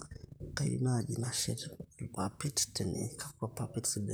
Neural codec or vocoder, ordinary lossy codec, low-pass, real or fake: vocoder, 44.1 kHz, 128 mel bands, Pupu-Vocoder; none; none; fake